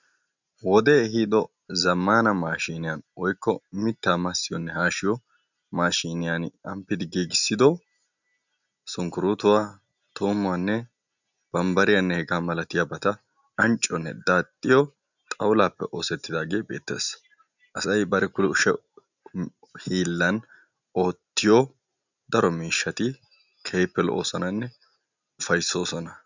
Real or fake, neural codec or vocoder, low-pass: real; none; 7.2 kHz